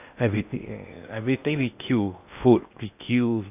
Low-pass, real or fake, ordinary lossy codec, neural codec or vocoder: 3.6 kHz; fake; AAC, 32 kbps; codec, 16 kHz in and 24 kHz out, 0.8 kbps, FocalCodec, streaming, 65536 codes